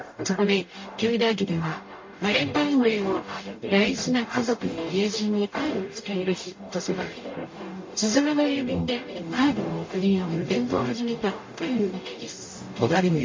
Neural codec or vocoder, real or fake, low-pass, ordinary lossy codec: codec, 44.1 kHz, 0.9 kbps, DAC; fake; 7.2 kHz; MP3, 32 kbps